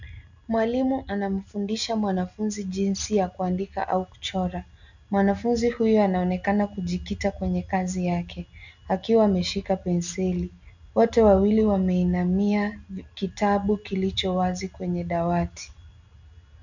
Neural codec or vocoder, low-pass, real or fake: none; 7.2 kHz; real